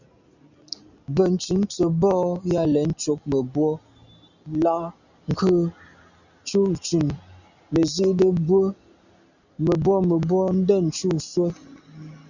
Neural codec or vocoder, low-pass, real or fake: none; 7.2 kHz; real